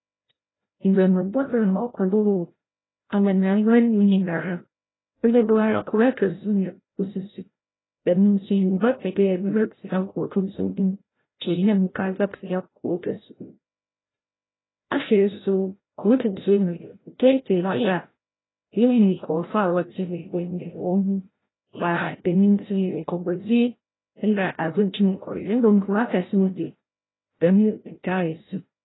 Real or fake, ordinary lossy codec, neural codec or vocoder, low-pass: fake; AAC, 16 kbps; codec, 16 kHz, 0.5 kbps, FreqCodec, larger model; 7.2 kHz